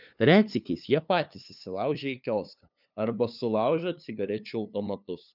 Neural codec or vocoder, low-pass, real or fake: codec, 16 kHz, 2 kbps, FunCodec, trained on LibriTTS, 25 frames a second; 5.4 kHz; fake